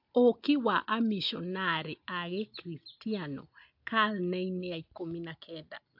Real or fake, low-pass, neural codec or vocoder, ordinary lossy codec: real; 5.4 kHz; none; AAC, 48 kbps